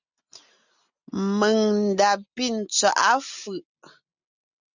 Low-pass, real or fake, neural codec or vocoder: 7.2 kHz; real; none